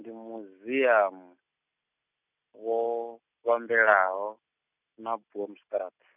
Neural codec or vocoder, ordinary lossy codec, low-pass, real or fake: none; none; 3.6 kHz; real